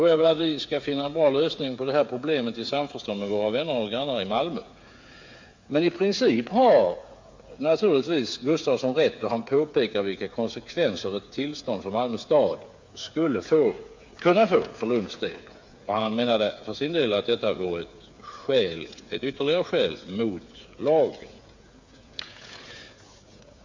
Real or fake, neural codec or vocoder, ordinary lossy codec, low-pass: fake; codec, 16 kHz, 8 kbps, FreqCodec, smaller model; MP3, 48 kbps; 7.2 kHz